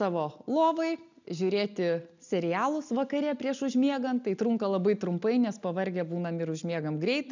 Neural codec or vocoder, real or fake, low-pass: none; real; 7.2 kHz